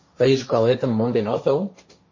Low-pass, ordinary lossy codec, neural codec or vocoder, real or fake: 7.2 kHz; MP3, 32 kbps; codec, 16 kHz, 1.1 kbps, Voila-Tokenizer; fake